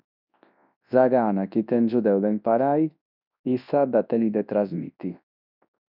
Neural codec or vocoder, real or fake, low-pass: codec, 24 kHz, 0.9 kbps, WavTokenizer, large speech release; fake; 5.4 kHz